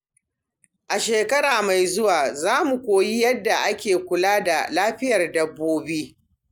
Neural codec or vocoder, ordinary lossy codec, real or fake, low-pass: none; none; real; none